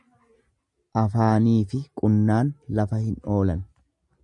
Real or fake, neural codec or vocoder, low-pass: real; none; 10.8 kHz